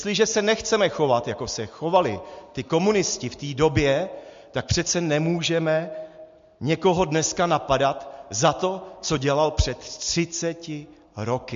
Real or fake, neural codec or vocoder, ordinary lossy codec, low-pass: real; none; MP3, 48 kbps; 7.2 kHz